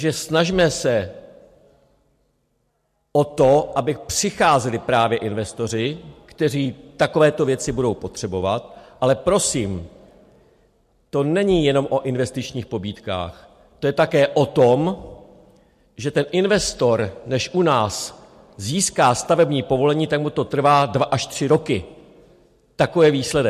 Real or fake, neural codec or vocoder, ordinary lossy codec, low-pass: real; none; MP3, 64 kbps; 14.4 kHz